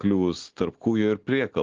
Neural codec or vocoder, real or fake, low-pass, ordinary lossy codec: none; real; 7.2 kHz; Opus, 16 kbps